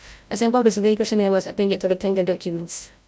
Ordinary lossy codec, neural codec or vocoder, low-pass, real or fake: none; codec, 16 kHz, 0.5 kbps, FreqCodec, larger model; none; fake